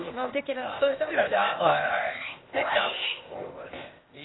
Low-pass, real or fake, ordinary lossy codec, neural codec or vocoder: 7.2 kHz; fake; AAC, 16 kbps; codec, 16 kHz, 0.8 kbps, ZipCodec